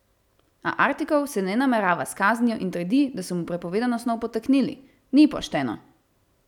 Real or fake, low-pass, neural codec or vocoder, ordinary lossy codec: real; 19.8 kHz; none; none